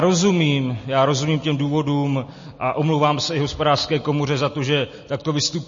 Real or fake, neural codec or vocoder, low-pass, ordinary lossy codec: real; none; 7.2 kHz; MP3, 32 kbps